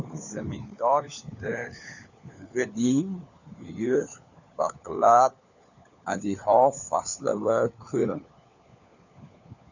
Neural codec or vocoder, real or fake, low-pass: codec, 16 kHz, 4 kbps, FunCodec, trained on LibriTTS, 50 frames a second; fake; 7.2 kHz